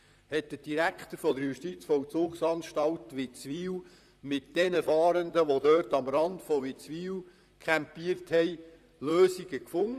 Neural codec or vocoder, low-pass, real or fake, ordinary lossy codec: vocoder, 44.1 kHz, 128 mel bands, Pupu-Vocoder; 14.4 kHz; fake; none